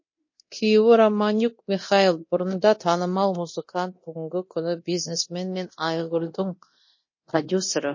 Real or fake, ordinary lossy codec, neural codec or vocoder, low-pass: fake; MP3, 32 kbps; codec, 24 kHz, 0.9 kbps, DualCodec; 7.2 kHz